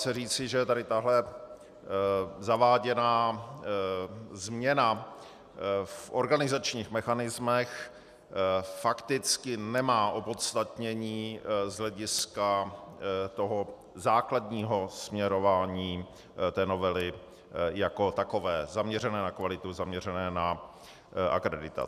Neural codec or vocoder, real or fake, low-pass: none; real; 14.4 kHz